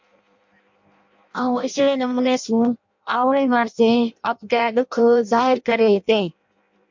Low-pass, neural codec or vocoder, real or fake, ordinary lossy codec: 7.2 kHz; codec, 16 kHz in and 24 kHz out, 0.6 kbps, FireRedTTS-2 codec; fake; MP3, 48 kbps